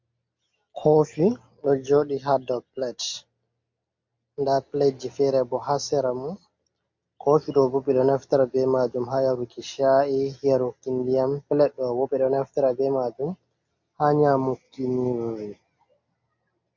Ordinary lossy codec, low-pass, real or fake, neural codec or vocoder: MP3, 48 kbps; 7.2 kHz; real; none